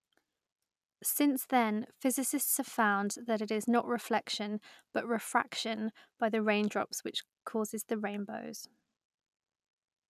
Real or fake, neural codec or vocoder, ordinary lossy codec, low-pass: real; none; none; 14.4 kHz